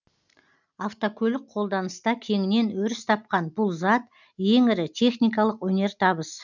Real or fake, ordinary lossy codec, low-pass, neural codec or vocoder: real; none; 7.2 kHz; none